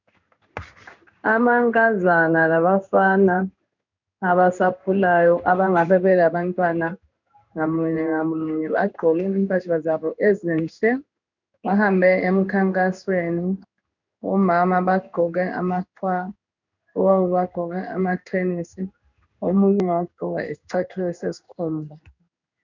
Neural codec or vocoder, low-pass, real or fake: codec, 16 kHz in and 24 kHz out, 1 kbps, XY-Tokenizer; 7.2 kHz; fake